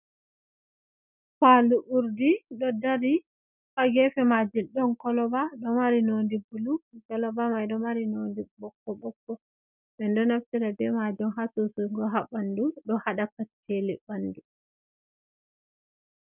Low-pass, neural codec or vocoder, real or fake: 3.6 kHz; none; real